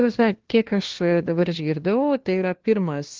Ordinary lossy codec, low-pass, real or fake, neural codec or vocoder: Opus, 16 kbps; 7.2 kHz; fake; codec, 16 kHz, 1 kbps, FunCodec, trained on LibriTTS, 50 frames a second